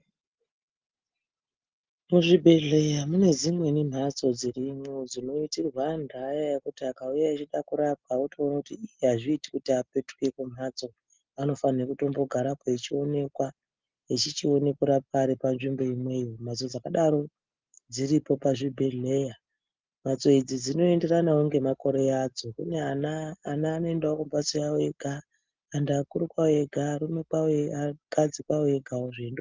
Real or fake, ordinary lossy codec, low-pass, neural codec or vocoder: real; Opus, 24 kbps; 7.2 kHz; none